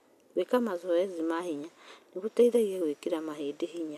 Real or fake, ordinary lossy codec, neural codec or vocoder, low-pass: real; none; none; 14.4 kHz